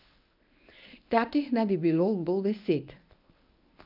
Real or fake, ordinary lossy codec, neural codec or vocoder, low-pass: fake; none; codec, 24 kHz, 0.9 kbps, WavTokenizer, medium speech release version 1; 5.4 kHz